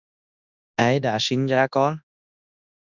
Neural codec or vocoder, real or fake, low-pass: codec, 24 kHz, 0.9 kbps, WavTokenizer, large speech release; fake; 7.2 kHz